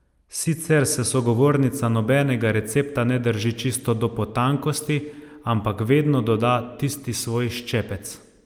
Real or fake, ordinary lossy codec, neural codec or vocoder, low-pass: real; Opus, 32 kbps; none; 19.8 kHz